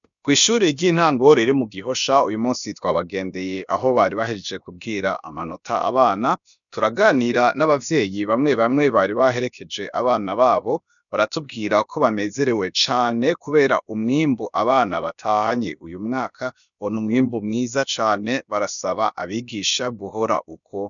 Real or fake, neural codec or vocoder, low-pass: fake; codec, 16 kHz, about 1 kbps, DyCAST, with the encoder's durations; 7.2 kHz